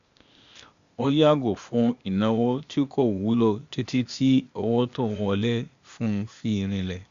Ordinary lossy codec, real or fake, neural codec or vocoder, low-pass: Opus, 64 kbps; fake; codec, 16 kHz, 0.8 kbps, ZipCodec; 7.2 kHz